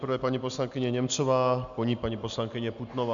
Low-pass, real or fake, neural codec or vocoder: 7.2 kHz; real; none